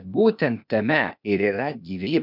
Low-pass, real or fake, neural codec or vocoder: 5.4 kHz; fake; codec, 16 kHz, 0.8 kbps, ZipCodec